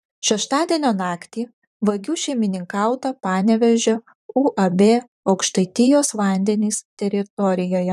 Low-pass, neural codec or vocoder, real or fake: 14.4 kHz; vocoder, 44.1 kHz, 128 mel bands, Pupu-Vocoder; fake